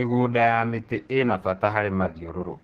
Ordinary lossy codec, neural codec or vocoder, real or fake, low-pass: Opus, 16 kbps; codec, 32 kHz, 1.9 kbps, SNAC; fake; 14.4 kHz